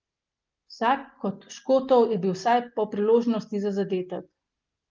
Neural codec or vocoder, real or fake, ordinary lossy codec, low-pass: none; real; Opus, 32 kbps; 7.2 kHz